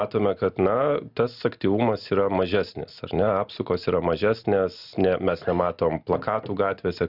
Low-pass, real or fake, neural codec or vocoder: 5.4 kHz; real; none